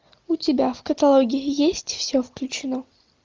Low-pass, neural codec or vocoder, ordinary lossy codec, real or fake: 7.2 kHz; none; Opus, 24 kbps; real